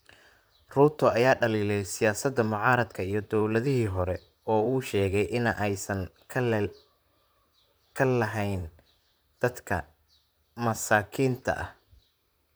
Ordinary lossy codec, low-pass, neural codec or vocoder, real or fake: none; none; vocoder, 44.1 kHz, 128 mel bands, Pupu-Vocoder; fake